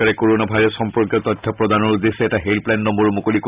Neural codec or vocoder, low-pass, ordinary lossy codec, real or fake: none; 3.6 kHz; Opus, 64 kbps; real